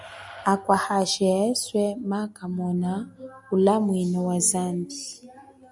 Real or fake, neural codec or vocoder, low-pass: real; none; 10.8 kHz